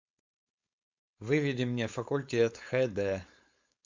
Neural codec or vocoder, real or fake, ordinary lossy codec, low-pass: codec, 16 kHz, 4.8 kbps, FACodec; fake; MP3, 64 kbps; 7.2 kHz